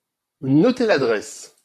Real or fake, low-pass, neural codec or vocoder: fake; 14.4 kHz; vocoder, 44.1 kHz, 128 mel bands, Pupu-Vocoder